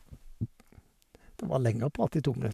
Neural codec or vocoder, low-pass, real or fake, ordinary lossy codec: codec, 44.1 kHz, 7.8 kbps, Pupu-Codec; 14.4 kHz; fake; none